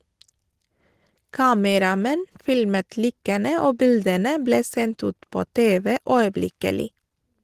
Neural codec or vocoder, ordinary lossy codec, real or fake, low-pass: none; Opus, 16 kbps; real; 14.4 kHz